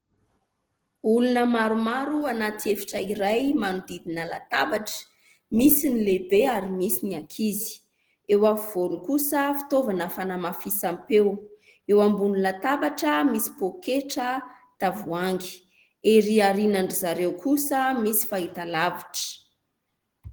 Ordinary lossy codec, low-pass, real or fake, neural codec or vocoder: Opus, 16 kbps; 19.8 kHz; real; none